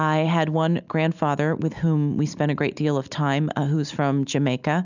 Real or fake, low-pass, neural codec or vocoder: real; 7.2 kHz; none